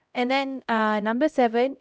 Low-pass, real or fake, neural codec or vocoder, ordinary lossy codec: none; fake; codec, 16 kHz, 0.5 kbps, X-Codec, HuBERT features, trained on LibriSpeech; none